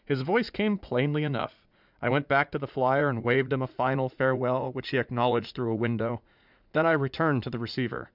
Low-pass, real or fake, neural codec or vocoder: 5.4 kHz; fake; vocoder, 44.1 kHz, 80 mel bands, Vocos